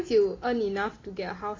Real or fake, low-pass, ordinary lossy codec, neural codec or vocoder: real; 7.2 kHz; AAC, 32 kbps; none